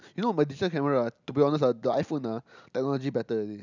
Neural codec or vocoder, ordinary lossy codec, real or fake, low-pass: none; none; real; 7.2 kHz